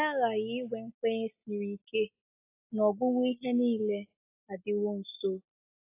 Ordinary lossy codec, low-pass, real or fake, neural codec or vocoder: AAC, 32 kbps; 3.6 kHz; real; none